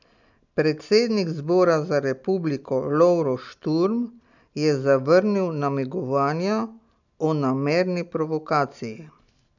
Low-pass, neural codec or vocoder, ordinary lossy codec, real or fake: 7.2 kHz; none; none; real